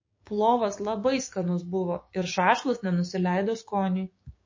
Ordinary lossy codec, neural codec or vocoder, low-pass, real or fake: MP3, 32 kbps; codec, 16 kHz, 6 kbps, DAC; 7.2 kHz; fake